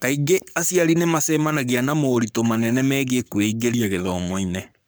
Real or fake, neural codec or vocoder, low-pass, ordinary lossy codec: fake; codec, 44.1 kHz, 7.8 kbps, Pupu-Codec; none; none